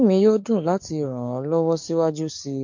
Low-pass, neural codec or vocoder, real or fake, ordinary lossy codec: 7.2 kHz; codec, 44.1 kHz, 7.8 kbps, DAC; fake; MP3, 48 kbps